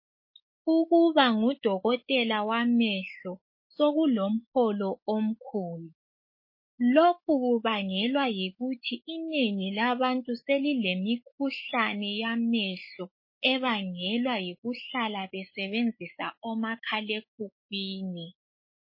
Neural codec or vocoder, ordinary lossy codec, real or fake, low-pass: autoencoder, 48 kHz, 128 numbers a frame, DAC-VAE, trained on Japanese speech; MP3, 24 kbps; fake; 5.4 kHz